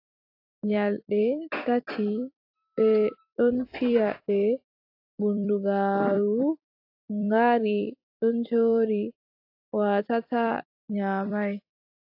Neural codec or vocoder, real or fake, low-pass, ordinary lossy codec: vocoder, 44.1 kHz, 128 mel bands every 256 samples, BigVGAN v2; fake; 5.4 kHz; AAC, 32 kbps